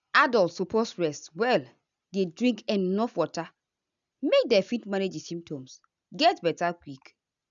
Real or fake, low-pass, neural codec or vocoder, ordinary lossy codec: real; 7.2 kHz; none; none